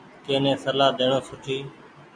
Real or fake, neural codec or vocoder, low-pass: real; none; 9.9 kHz